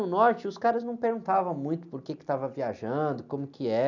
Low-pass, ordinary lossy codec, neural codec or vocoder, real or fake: 7.2 kHz; none; none; real